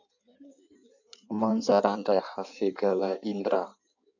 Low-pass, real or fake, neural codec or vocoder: 7.2 kHz; fake; codec, 16 kHz in and 24 kHz out, 1.1 kbps, FireRedTTS-2 codec